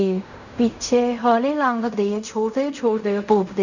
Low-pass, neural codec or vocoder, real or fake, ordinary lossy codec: 7.2 kHz; codec, 16 kHz in and 24 kHz out, 0.4 kbps, LongCat-Audio-Codec, fine tuned four codebook decoder; fake; none